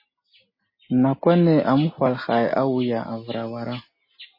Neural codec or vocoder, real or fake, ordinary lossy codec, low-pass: none; real; MP3, 24 kbps; 5.4 kHz